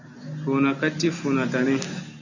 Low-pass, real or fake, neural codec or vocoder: 7.2 kHz; real; none